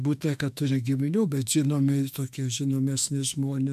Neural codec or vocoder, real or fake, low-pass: autoencoder, 48 kHz, 32 numbers a frame, DAC-VAE, trained on Japanese speech; fake; 14.4 kHz